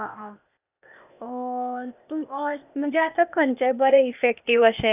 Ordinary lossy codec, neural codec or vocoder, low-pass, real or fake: none; codec, 16 kHz, 0.8 kbps, ZipCodec; 3.6 kHz; fake